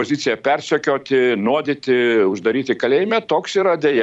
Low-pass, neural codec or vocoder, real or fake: 10.8 kHz; vocoder, 44.1 kHz, 128 mel bands every 256 samples, BigVGAN v2; fake